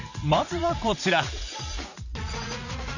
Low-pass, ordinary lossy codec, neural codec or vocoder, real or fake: 7.2 kHz; none; vocoder, 44.1 kHz, 80 mel bands, Vocos; fake